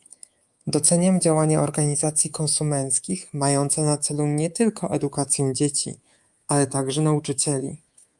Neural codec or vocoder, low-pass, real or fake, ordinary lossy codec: codec, 24 kHz, 3.1 kbps, DualCodec; 10.8 kHz; fake; Opus, 32 kbps